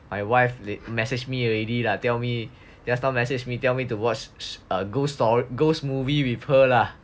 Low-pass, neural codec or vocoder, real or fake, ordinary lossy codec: none; none; real; none